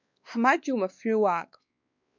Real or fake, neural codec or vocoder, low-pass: fake; codec, 16 kHz, 2 kbps, X-Codec, WavLM features, trained on Multilingual LibriSpeech; 7.2 kHz